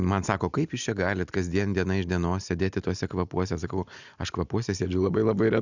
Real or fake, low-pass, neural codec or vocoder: real; 7.2 kHz; none